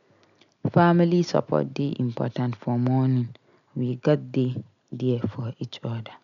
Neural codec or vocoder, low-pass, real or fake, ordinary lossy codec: none; 7.2 kHz; real; none